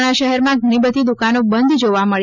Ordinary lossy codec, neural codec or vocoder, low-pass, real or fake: none; none; 7.2 kHz; real